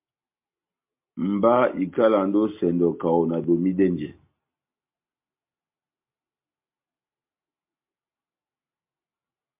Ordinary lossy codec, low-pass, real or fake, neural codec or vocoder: MP3, 32 kbps; 3.6 kHz; real; none